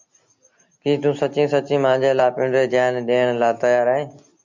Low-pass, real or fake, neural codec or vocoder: 7.2 kHz; real; none